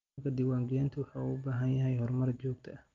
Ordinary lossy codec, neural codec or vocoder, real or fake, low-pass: Opus, 32 kbps; none; real; 7.2 kHz